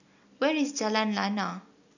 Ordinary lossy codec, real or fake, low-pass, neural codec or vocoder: none; real; 7.2 kHz; none